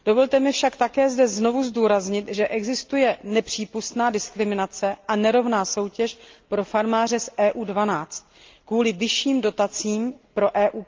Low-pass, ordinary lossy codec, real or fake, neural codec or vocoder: 7.2 kHz; Opus, 32 kbps; real; none